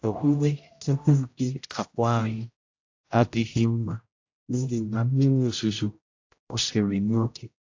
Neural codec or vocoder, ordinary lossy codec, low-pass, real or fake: codec, 16 kHz, 0.5 kbps, X-Codec, HuBERT features, trained on general audio; AAC, 48 kbps; 7.2 kHz; fake